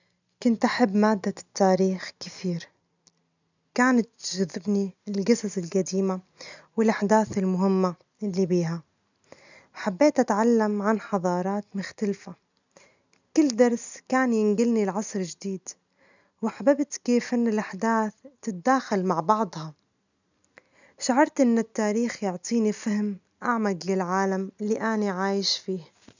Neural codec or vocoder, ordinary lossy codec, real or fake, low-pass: none; none; real; 7.2 kHz